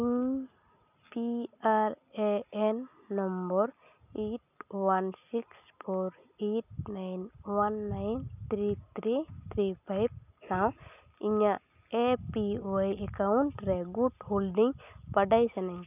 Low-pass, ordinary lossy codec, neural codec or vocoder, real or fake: 3.6 kHz; none; none; real